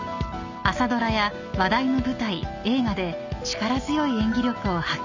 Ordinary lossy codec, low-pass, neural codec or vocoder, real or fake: none; 7.2 kHz; none; real